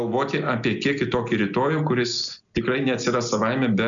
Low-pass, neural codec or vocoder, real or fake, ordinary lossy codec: 7.2 kHz; none; real; MP3, 96 kbps